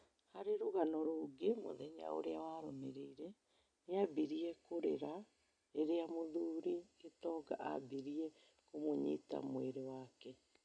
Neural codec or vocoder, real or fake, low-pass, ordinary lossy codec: none; real; 9.9 kHz; none